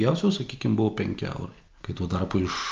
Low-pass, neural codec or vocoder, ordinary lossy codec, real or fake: 7.2 kHz; none; Opus, 24 kbps; real